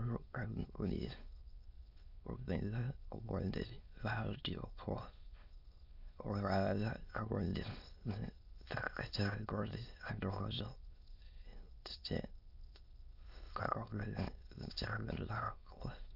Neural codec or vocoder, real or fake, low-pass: autoencoder, 22.05 kHz, a latent of 192 numbers a frame, VITS, trained on many speakers; fake; 5.4 kHz